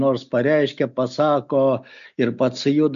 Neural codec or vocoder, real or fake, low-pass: none; real; 7.2 kHz